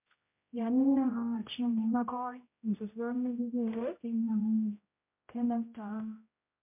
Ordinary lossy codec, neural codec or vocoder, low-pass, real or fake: MP3, 32 kbps; codec, 16 kHz, 0.5 kbps, X-Codec, HuBERT features, trained on general audio; 3.6 kHz; fake